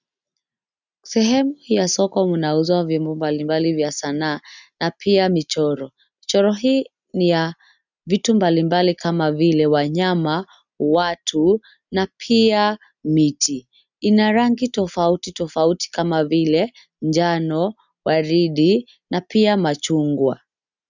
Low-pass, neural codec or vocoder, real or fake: 7.2 kHz; none; real